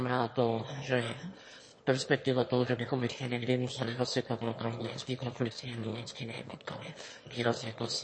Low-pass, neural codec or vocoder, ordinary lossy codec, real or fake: 9.9 kHz; autoencoder, 22.05 kHz, a latent of 192 numbers a frame, VITS, trained on one speaker; MP3, 32 kbps; fake